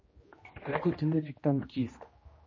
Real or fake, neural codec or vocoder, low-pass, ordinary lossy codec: fake; codec, 16 kHz, 1 kbps, X-Codec, HuBERT features, trained on general audio; 7.2 kHz; MP3, 32 kbps